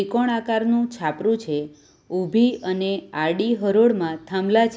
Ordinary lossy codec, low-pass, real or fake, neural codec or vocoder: none; none; real; none